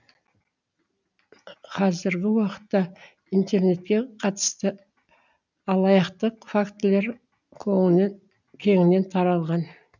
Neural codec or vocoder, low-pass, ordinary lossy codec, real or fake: none; 7.2 kHz; none; real